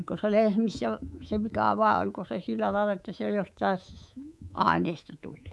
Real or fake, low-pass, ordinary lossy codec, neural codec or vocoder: fake; none; none; codec, 24 kHz, 3.1 kbps, DualCodec